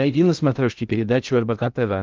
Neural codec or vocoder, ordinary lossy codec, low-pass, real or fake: codec, 16 kHz in and 24 kHz out, 0.6 kbps, FocalCodec, streaming, 2048 codes; Opus, 24 kbps; 7.2 kHz; fake